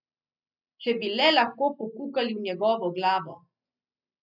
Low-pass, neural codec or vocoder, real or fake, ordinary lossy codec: 5.4 kHz; none; real; none